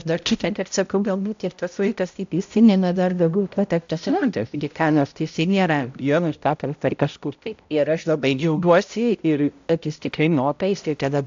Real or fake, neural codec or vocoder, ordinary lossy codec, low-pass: fake; codec, 16 kHz, 0.5 kbps, X-Codec, HuBERT features, trained on balanced general audio; AAC, 64 kbps; 7.2 kHz